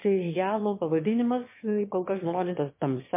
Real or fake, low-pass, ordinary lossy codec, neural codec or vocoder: fake; 3.6 kHz; MP3, 24 kbps; autoencoder, 22.05 kHz, a latent of 192 numbers a frame, VITS, trained on one speaker